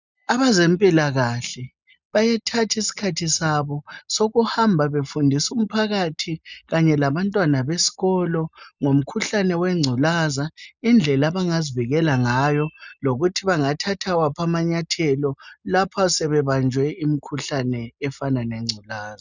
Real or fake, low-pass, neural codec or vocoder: real; 7.2 kHz; none